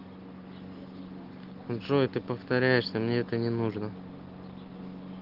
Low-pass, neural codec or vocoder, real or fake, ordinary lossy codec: 5.4 kHz; none; real; Opus, 16 kbps